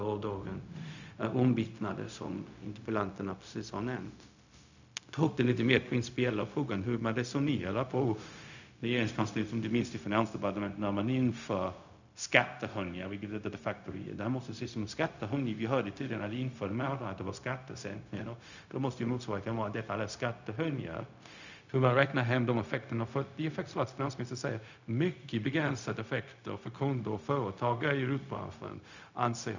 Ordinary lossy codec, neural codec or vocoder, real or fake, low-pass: none; codec, 16 kHz, 0.4 kbps, LongCat-Audio-Codec; fake; 7.2 kHz